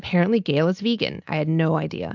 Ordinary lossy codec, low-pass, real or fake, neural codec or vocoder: MP3, 64 kbps; 7.2 kHz; real; none